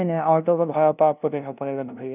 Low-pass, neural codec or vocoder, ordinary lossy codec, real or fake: 3.6 kHz; codec, 16 kHz, 0.5 kbps, FunCodec, trained on LibriTTS, 25 frames a second; none; fake